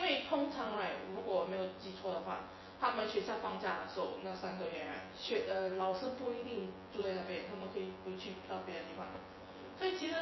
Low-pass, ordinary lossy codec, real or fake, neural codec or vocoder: 7.2 kHz; MP3, 24 kbps; fake; vocoder, 24 kHz, 100 mel bands, Vocos